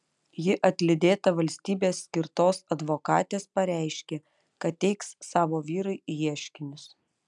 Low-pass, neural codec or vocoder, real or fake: 10.8 kHz; none; real